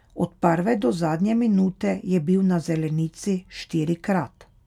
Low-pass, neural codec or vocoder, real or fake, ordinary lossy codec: 19.8 kHz; none; real; none